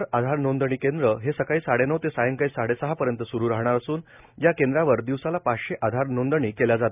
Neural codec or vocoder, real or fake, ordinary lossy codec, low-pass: none; real; none; 3.6 kHz